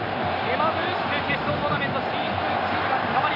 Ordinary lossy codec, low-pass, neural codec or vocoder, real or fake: none; 5.4 kHz; none; real